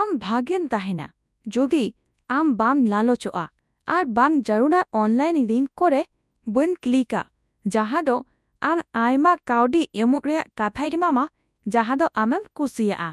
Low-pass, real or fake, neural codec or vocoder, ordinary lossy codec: none; fake; codec, 24 kHz, 0.9 kbps, WavTokenizer, large speech release; none